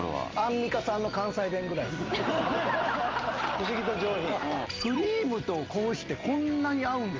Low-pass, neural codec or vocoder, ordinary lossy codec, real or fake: 7.2 kHz; none; Opus, 32 kbps; real